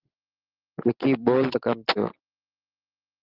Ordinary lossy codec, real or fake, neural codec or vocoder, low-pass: Opus, 16 kbps; real; none; 5.4 kHz